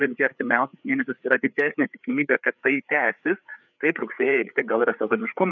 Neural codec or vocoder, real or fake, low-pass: codec, 16 kHz, 4 kbps, FreqCodec, larger model; fake; 7.2 kHz